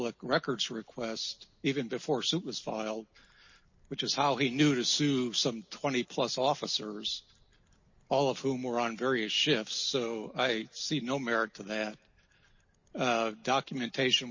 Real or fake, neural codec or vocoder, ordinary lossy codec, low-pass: real; none; MP3, 32 kbps; 7.2 kHz